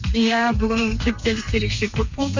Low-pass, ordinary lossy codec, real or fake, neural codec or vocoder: 7.2 kHz; MP3, 64 kbps; fake; codec, 32 kHz, 1.9 kbps, SNAC